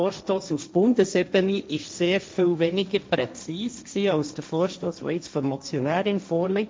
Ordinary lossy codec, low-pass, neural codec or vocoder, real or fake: none; none; codec, 16 kHz, 1.1 kbps, Voila-Tokenizer; fake